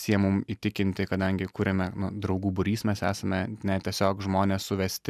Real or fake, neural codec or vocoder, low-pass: real; none; 14.4 kHz